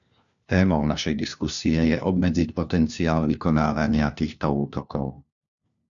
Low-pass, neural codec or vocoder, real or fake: 7.2 kHz; codec, 16 kHz, 1 kbps, FunCodec, trained on LibriTTS, 50 frames a second; fake